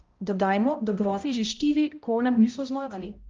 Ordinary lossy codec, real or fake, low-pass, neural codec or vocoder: Opus, 24 kbps; fake; 7.2 kHz; codec, 16 kHz, 0.5 kbps, X-Codec, HuBERT features, trained on balanced general audio